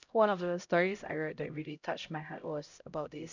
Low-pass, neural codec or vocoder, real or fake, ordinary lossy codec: 7.2 kHz; codec, 16 kHz, 0.5 kbps, X-Codec, HuBERT features, trained on LibriSpeech; fake; none